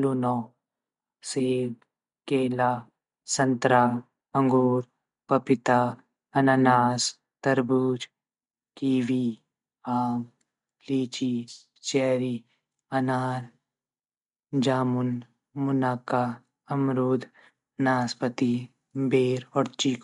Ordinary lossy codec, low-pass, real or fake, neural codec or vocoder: MP3, 64 kbps; 10.8 kHz; real; none